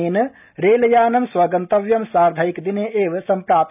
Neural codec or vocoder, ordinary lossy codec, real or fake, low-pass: none; none; real; 3.6 kHz